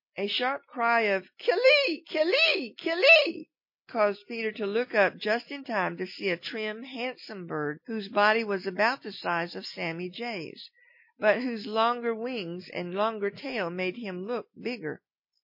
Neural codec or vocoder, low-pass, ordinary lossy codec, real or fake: none; 5.4 kHz; MP3, 32 kbps; real